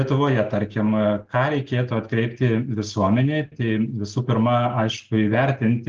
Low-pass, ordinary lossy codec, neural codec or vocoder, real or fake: 7.2 kHz; Opus, 16 kbps; none; real